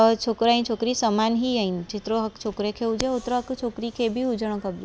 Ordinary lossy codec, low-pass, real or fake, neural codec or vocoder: none; none; real; none